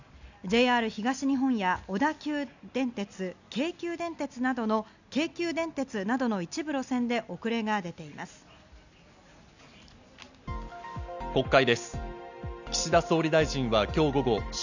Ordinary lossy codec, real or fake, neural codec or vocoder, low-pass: none; real; none; 7.2 kHz